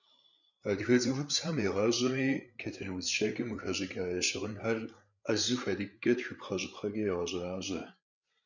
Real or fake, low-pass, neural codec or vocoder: fake; 7.2 kHz; codec, 16 kHz, 8 kbps, FreqCodec, larger model